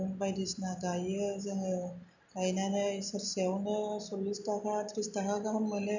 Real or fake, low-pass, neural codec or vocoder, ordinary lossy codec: real; 7.2 kHz; none; none